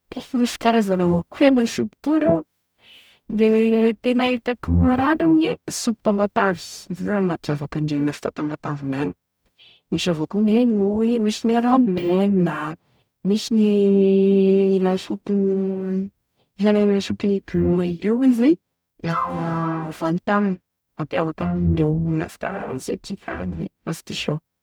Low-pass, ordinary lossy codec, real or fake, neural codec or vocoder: none; none; fake; codec, 44.1 kHz, 0.9 kbps, DAC